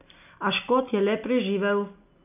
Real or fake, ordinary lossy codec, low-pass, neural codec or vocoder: real; none; 3.6 kHz; none